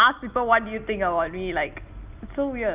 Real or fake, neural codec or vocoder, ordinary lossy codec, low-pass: real; none; Opus, 32 kbps; 3.6 kHz